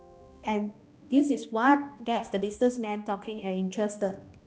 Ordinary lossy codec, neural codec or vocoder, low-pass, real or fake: none; codec, 16 kHz, 1 kbps, X-Codec, HuBERT features, trained on balanced general audio; none; fake